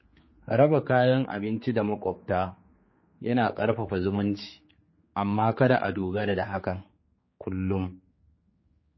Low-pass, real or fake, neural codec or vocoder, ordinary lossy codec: 7.2 kHz; fake; codec, 16 kHz, 4 kbps, X-Codec, HuBERT features, trained on general audio; MP3, 24 kbps